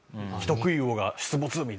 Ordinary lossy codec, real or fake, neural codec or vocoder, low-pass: none; real; none; none